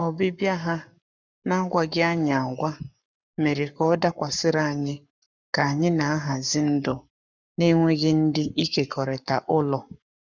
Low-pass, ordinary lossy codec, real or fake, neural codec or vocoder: 7.2 kHz; none; fake; codec, 44.1 kHz, 7.8 kbps, Pupu-Codec